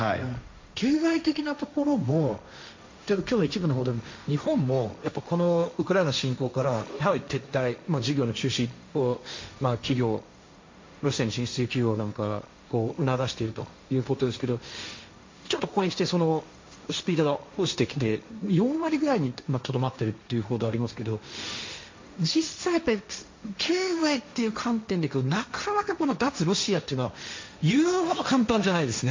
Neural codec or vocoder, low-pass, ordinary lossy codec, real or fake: codec, 16 kHz, 1.1 kbps, Voila-Tokenizer; 7.2 kHz; MP3, 48 kbps; fake